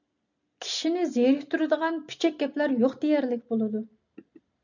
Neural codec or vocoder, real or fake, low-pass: none; real; 7.2 kHz